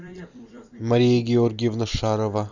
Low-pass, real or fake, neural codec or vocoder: 7.2 kHz; fake; vocoder, 44.1 kHz, 128 mel bands every 256 samples, BigVGAN v2